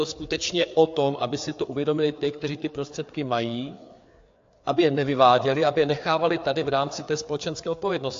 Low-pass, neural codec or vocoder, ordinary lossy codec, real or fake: 7.2 kHz; codec, 16 kHz, 4 kbps, FreqCodec, larger model; AAC, 48 kbps; fake